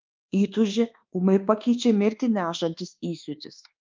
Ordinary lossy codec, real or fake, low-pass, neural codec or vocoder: Opus, 24 kbps; fake; 7.2 kHz; codec, 24 kHz, 1.2 kbps, DualCodec